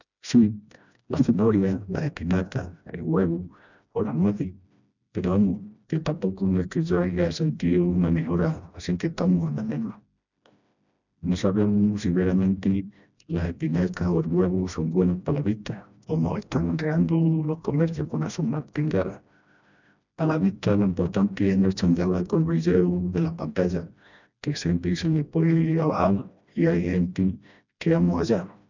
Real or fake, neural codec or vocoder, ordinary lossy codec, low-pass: fake; codec, 16 kHz, 1 kbps, FreqCodec, smaller model; none; 7.2 kHz